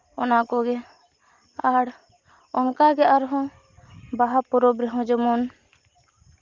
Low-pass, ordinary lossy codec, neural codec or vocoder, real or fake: 7.2 kHz; Opus, 32 kbps; none; real